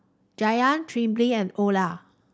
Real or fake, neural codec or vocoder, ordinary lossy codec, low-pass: real; none; none; none